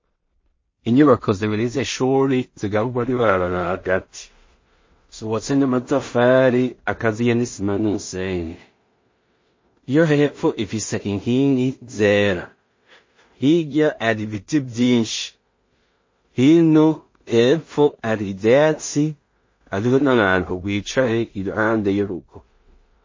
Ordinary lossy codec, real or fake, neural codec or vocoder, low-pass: MP3, 32 kbps; fake; codec, 16 kHz in and 24 kHz out, 0.4 kbps, LongCat-Audio-Codec, two codebook decoder; 7.2 kHz